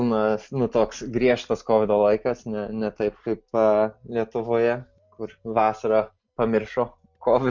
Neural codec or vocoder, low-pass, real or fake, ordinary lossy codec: none; 7.2 kHz; real; MP3, 64 kbps